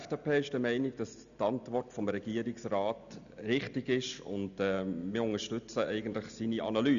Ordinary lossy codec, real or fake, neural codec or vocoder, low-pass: none; real; none; 7.2 kHz